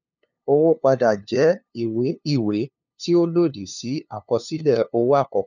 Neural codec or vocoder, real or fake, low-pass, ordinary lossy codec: codec, 16 kHz, 2 kbps, FunCodec, trained on LibriTTS, 25 frames a second; fake; 7.2 kHz; none